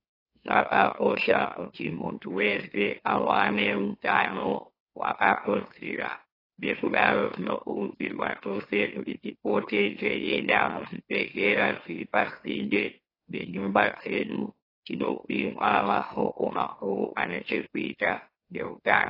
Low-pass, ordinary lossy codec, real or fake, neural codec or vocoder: 5.4 kHz; AAC, 24 kbps; fake; autoencoder, 44.1 kHz, a latent of 192 numbers a frame, MeloTTS